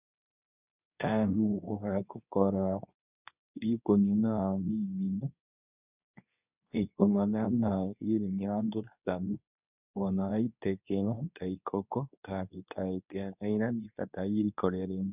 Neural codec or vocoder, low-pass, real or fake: codec, 24 kHz, 0.9 kbps, WavTokenizer, medium speech release version 2; 3.6 kHz; fake